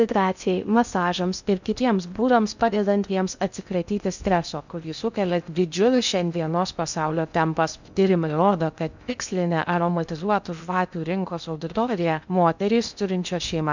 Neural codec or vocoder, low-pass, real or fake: codec, 16 kHz in and 24 kHz out, 0.6 kbps, FocalCodec, streaming, 2048 codes; 7.2 kHz; fake